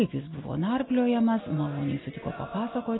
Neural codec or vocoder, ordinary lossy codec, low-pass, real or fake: none; AAC, 16 kbps; 7.2 kHz; real